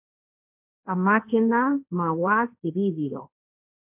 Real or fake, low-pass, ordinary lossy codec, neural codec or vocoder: fake; 3.6 kHz; MP3, 32 kbps; codec, 16 kHz, 1.1 kbps, Voila-Tokenizer